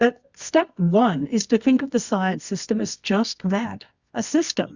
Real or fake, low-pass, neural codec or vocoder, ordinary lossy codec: fake; 7.2 kHz; codec, 24 kHz, 0.9 kbps, WavTokenizer, medium music audio release; Opus, 64 kbps